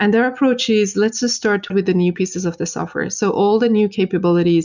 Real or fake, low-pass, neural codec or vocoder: real; 7.2 kHz; none